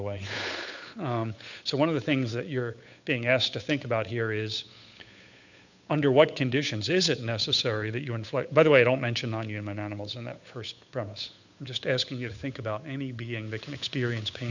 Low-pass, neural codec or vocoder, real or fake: 7.2 kHz; codec, 16 kHz, 8 kbps, FunCodec, trained on Chinese and English, 25 frames a second; fake